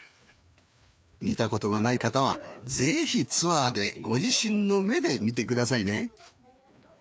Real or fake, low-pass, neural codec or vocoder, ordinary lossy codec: fake; none; codec, 16 kHz, 2 kbps, FreqCodec, larger model; none